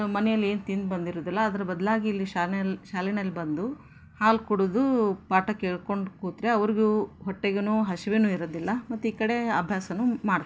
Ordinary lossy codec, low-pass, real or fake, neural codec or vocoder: none; none; real; none